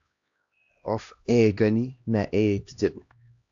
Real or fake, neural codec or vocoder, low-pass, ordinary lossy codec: fake; codec, 16 kHz, 1 kbps, X-Codec, HuBERT features, trained on LibriSpeech; 7.2 kHz; AAC, 48 kbps